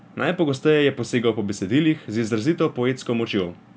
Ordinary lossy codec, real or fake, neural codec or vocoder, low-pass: none; real; none; none